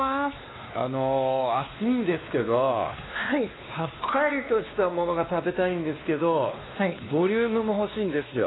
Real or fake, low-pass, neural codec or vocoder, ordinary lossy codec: fake; 7.2 kHz; codec, 16 kHz, 2 kbps, X-Codec, WavLM features, trained on Multilingual LibriSpeech; AAC, 16 kbps